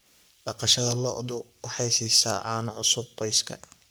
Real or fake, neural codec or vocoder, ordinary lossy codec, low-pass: fake; codec, 44.1 kHz, 3.4 kbps, Pupu-Codec; none; none